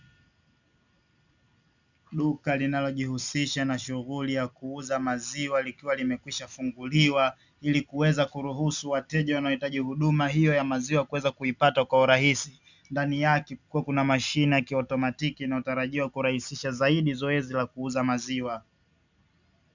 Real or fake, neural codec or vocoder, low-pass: real; none; 7.2 kHz